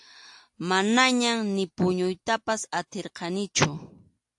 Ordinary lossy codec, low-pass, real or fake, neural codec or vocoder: AAC, 64 kbps; 10.8 kHz; real; none